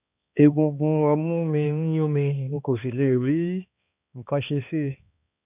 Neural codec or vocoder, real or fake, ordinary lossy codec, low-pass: codec, 16 kHz, 2 kbps, X-Codec, HuBERT features, trained on balanced general audio; fake; none; 3.6 kHz